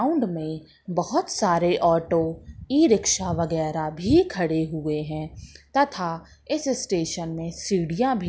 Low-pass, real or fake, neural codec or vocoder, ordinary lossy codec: none; real; none; none